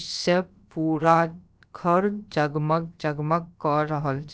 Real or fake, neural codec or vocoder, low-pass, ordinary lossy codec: fake; codec, 16 kHz, about 1 kbps, DyCAST, with the encoder's durations; none; none